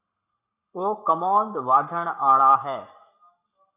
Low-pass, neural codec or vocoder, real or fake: 3.6 kHz; codec, 44.1 kHz, 7.8 kbps, Pupu-Codec; fake